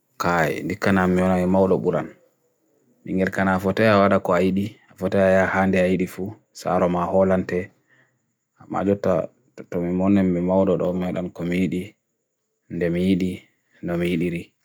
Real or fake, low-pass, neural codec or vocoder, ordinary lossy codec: real; none; none; none